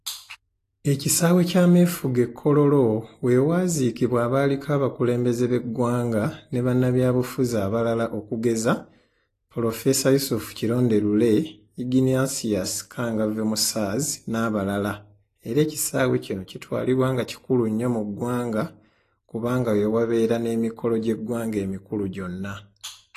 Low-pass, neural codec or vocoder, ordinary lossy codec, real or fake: 14.4 kHz; none; AAC, 48 kbps; real